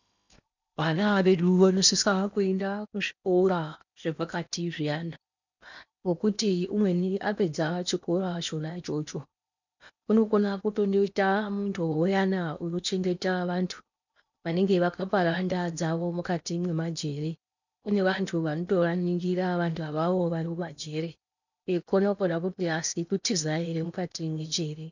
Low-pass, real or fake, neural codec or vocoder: 7.2 kHz; fake; codec, 16 kHz in and 24 kHz out, 0.8 kbps, FocalCodec, streaming, 65536 codes